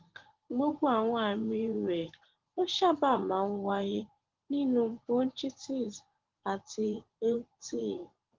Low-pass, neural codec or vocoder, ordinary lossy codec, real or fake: 7.2 kHz; vocoder, 24 kHz, 100 mel bands, Vocos; Opus, 16 kbps; fake